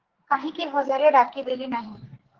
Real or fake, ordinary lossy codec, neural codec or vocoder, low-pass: fake; Opus, 16 kbps; codec, 44.1 kHz, 2.6 kbps, SNAC; 7.2 kHz